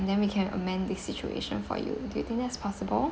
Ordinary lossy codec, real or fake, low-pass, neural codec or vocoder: none; real; none; none